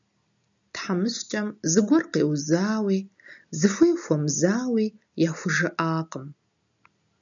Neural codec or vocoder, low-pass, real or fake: none; 7.2 kHz; real